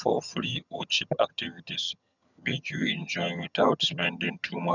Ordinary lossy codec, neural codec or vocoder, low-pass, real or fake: none; vocoder, 22.05 kHz, 80 mel bands, HiFi-GAN; 7.2 kHz; fake